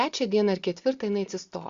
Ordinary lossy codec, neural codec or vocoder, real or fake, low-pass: Opus, 64 kbps; none; real; 7.2 kHz